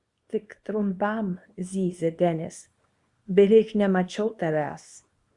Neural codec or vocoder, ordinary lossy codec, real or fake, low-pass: codec, 24 kHz, 0.9 kbps, WavTokenizer, small release; Opus, 64 kbps; fake; 10.8 kHz